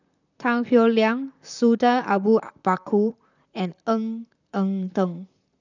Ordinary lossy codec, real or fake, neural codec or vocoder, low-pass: none; fake; vocoder, 44.1 kHz, 128 mel bands, Pupu-Vocoder; 7.2 kHz